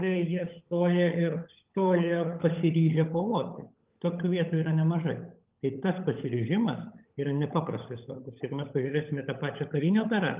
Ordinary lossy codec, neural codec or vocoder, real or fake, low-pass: Opus, 32 kbps; codec, 16 kHz, 16 kbps, FunCodec, trained on LibriTTS, 50 frames a second; fake; 3.6 kHz